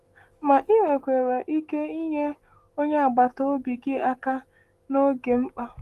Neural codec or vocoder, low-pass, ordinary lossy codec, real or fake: autoencoder, 48 kHz, 128 numbers a frame, DAC-VAE, trained on Japanese speech; 14.4 kHz; Opus, 24 kbps; fake